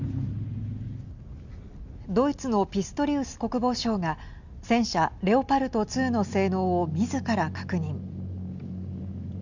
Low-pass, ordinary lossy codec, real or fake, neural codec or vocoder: 7.2 kHz; Opus, 64 kbps; real; none